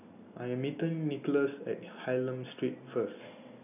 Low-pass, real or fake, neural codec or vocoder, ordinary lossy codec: 3.6 kHz; real; none; none